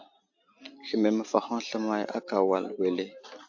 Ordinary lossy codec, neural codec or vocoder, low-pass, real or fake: AAC, 48 kbps; none; 7.2 kHz; real